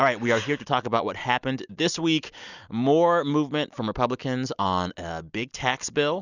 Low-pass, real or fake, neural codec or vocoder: 7.2 kHz; real; none